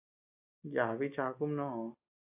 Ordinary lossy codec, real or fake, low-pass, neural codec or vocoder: AAC, 24 kbps; real; 3.6 kHz; none